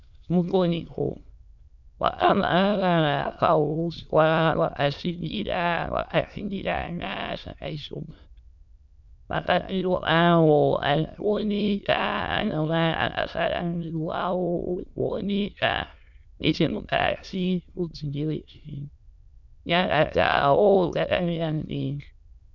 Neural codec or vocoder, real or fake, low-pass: autoencoder, 22.05 kHz, a latent of 192 numbers a frame, VITS, trained on many speakers; fake; 7.2 kHz